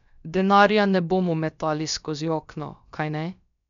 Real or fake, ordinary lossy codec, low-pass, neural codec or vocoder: fake; none; 7.2 kHz; codec, 16 kHz, about 1 kbps, DyCAST, with the encoder's durations